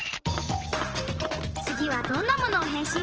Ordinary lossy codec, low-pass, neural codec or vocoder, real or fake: Opus, 16 kbps; 7.2 kHz; vocoder, 44.1 kHz, 128 mel bands every 512 samples, BigVGAN v2; fake